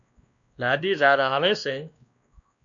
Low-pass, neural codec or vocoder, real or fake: 7.2 kHz; codec, 16 kHz, 1 kbps, X-Codec, WavLM features, trained on Multilingual LibriSpeech; fake